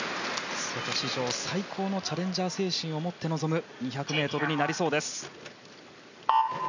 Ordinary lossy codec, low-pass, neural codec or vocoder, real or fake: none; 7.2 kHz; none; real